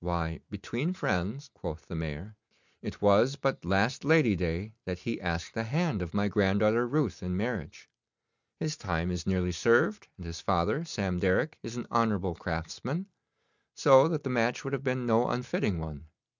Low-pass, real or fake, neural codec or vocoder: 7.2 kHz; real; none